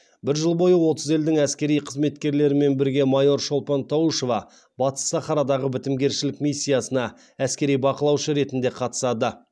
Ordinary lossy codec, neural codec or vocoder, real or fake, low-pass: none; none; real; none